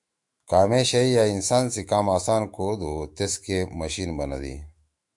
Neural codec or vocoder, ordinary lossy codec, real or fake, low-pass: autoencoder, 48 kHz, 128 numbers a frame, DAC-VAE, trained on Japanese speech; MP3, 64 kbps; fake; 10.8 kHz